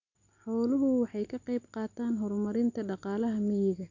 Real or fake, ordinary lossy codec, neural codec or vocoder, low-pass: real; none; none; 7.2 kHz